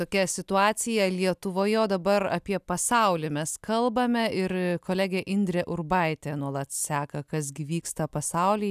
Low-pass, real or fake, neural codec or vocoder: 14.4 kHz; real; none